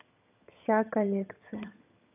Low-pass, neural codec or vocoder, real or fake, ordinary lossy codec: 3.6 kHz; vocoder, 22.05 kHz, 80 mel bands, HiFi-GAN; fake; none